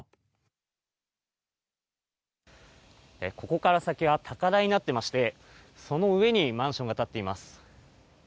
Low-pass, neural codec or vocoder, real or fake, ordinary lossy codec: none; none; real; none